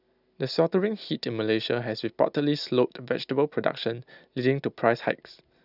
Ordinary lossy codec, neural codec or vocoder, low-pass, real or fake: none; none; 5.4 kHz; real